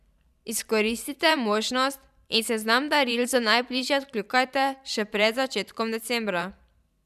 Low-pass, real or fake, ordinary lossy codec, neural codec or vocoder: 14.4 kHz; fake; none; vocoder, 44.1 kHz, 128 mel bands every 512 samples, BigVGAN v2